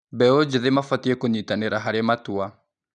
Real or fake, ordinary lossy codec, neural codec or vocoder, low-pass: real; none; none; 9.9 kHz